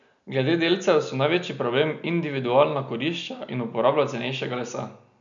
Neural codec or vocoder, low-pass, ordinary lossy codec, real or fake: none; 7.2 kHz; none; real